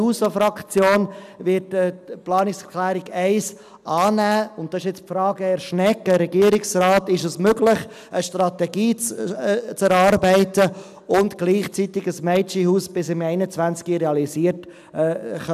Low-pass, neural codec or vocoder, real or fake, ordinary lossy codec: 14.4 kHz; none; real; none